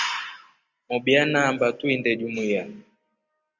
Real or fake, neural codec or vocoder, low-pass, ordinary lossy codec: real; none; 7.2 kHz; Opus, 64 kbps